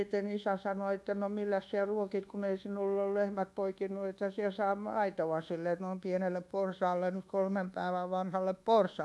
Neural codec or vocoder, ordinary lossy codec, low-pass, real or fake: codec, 24 kHz, 1.2 kbps, DualCodec; none; none; fake